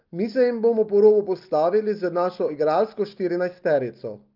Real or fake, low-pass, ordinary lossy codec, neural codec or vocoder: real; 5.4 kHz; Opus, 32 kbps; none